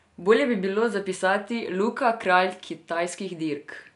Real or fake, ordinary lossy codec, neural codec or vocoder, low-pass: real; none; none; 10.8 kHz